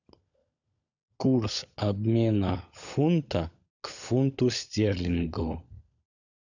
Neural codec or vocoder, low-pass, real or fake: codec, 16 kHz, 16 kbps, FunCodec, trained on LibriTTS, 50 frames a second; 7.2 kHz; fake